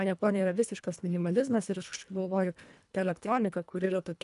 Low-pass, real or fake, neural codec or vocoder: 10.8 kHz; fake; codec, 24 kHz, 1.5 kbps, HILCodec